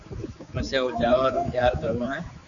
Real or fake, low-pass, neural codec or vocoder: fake; 7.2 kHz; codec, 16 kHz, 4 kbps, X-Codec, HuBERT features, trained on general audio